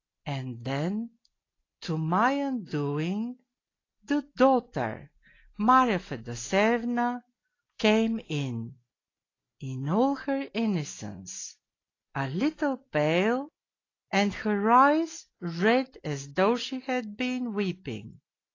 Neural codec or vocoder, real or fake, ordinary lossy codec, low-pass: none; real; AAC, 32 kbps; 7.2 kHz